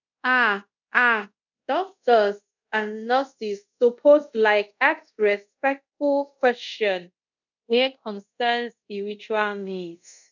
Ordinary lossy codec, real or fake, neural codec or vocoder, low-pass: none; fake; codec, 24 kHz, 0.5 kbps, DualCodec; 7.2 kHz